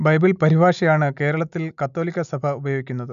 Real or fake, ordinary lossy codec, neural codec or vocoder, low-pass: real; none; none; 7.2 kHz